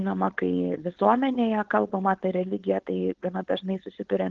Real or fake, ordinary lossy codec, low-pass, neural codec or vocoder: fake; Opus, 16 kbps; 7.2 kHz; codec, 16 kHz, 4.8 kbps, FACodec